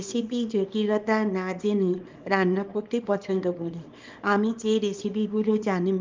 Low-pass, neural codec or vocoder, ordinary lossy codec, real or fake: 7.2 kHz; codec, 24 kHz, 0.9 kbps, WavTokenizer, small release; Opus, 32 kbps; fake